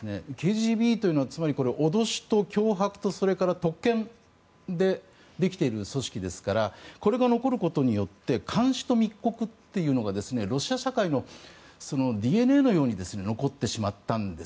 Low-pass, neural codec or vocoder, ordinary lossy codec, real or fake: none; none; none; real